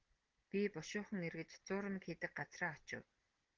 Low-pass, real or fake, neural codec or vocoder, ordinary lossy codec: 7.2 kHz; real; none; Opus, 16 kbps